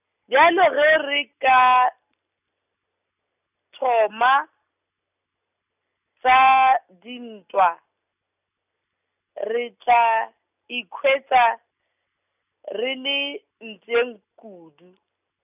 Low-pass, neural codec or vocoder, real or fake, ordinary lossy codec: 3.6 kHz; none; real; none